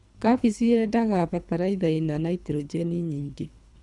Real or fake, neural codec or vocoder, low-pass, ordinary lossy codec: fake; codec, 24 kHz, 3 kbps, HILCodec; 10.8 kHz; none